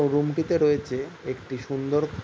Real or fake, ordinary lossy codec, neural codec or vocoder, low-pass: real; none; none; none